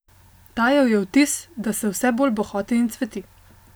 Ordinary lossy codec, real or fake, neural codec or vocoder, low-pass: none; real; none; none